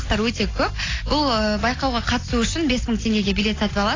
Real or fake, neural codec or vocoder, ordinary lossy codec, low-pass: real; none; AAC, 32 kbps; 7.2 kHz